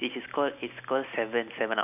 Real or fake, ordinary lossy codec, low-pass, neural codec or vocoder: real; none; 3.6 kHz; none